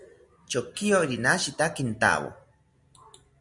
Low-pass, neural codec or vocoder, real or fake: 10.8 kHz; none; real